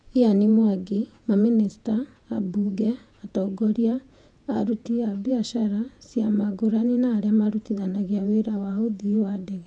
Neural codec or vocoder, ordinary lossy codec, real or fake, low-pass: vocoder, 48 kHz, 128 mel bands, Vocos; none; fake; 9.9 kHz